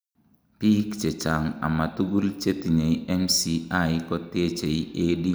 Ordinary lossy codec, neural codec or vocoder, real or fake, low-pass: none; none; real; none